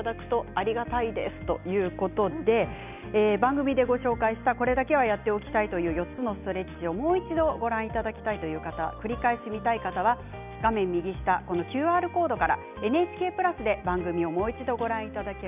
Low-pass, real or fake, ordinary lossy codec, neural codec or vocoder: 3.6 kHz; real; none; none